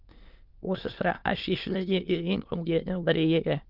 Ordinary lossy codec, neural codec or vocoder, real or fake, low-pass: Opus, 24 kbps; autoencoder, 22.05 kHz, a latent of 192 numbers a frame, VITS, trained on many speakers; fake; 5.4 kHz